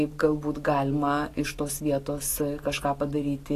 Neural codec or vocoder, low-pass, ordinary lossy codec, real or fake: none; 14.4 kHz; AAC, 48 kbps; real